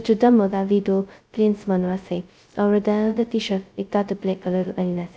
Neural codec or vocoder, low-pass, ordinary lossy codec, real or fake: codec, 16 kHz, 0.2 kbps, FocalCodec; none; none; fake